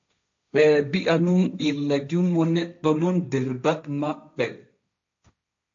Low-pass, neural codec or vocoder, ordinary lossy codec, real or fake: 7.2 kHz; codec, 16 kHz, 1.1 kbps, Voila-Tokenizer; AAC, 64 kbps; fake